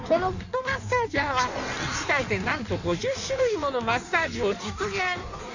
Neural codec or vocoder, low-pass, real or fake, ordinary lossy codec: codec, 16 kHz in and 24 kHz out, 1.1 kbps, FireRedTTS-2 codec; 7.2 kHz; fake; none